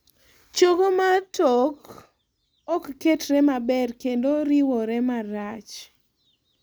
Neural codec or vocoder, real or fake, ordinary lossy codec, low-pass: none; real; none; none